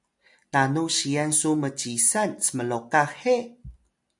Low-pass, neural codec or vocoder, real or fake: 10.8 kHz; none; real